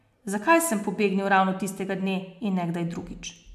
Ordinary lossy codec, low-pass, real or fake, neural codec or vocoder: AAC, 96 kbps; 14.4 kHz; real; none